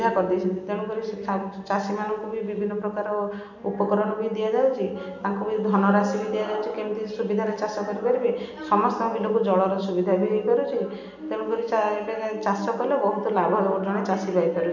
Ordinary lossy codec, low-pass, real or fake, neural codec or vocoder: none; 7.2 kHz; real; none